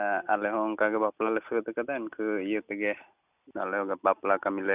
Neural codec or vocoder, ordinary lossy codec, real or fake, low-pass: none; none; real; 3.6 kHz